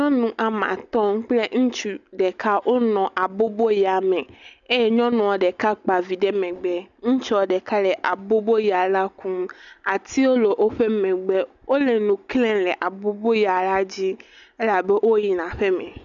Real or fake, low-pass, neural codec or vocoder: real; 7.2 kHz; none